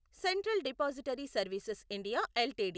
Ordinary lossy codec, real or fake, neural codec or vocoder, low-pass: none; real; none; none